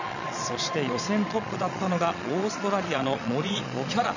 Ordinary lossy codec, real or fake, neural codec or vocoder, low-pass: none; fake; vocoder, 22.05 kHz, 80 mel bands, Vocos; 7.2 kHz